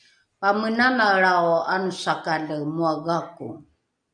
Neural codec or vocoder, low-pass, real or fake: none; 9.9 kHz; real